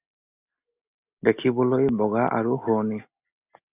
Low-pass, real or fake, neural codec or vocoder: 3.6 kHz; real; none